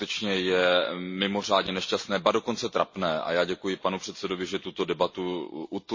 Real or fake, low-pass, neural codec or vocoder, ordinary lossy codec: real; 7.2 kHz; none; MP3, 32 kbps